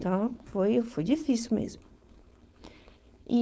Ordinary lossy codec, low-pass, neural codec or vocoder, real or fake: none; none; codec, 16 kHz, 4.8 kbps, FACodec; fake